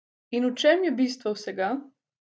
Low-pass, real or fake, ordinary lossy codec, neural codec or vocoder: none; real; none; none